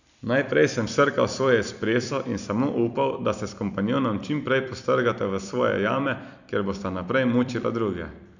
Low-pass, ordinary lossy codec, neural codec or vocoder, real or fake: 7.2 kHz; none; vocoder, 44.1 kHz, 128 mel bands every 256 samples, BigVGAN v2; fake